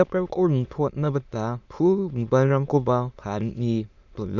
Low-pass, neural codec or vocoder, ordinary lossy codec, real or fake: 7.2 kHz; autoencoder, 22.05 kHz, a latent of 192 numbers a frame, VITS, trained on many speakers; none; fake